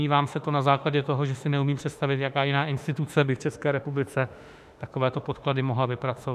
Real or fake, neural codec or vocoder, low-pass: fake; autoencoder, 48 kHz, 32 numbers a frame, DAC-VAE, trained on Japanese speech; 14.4 kHz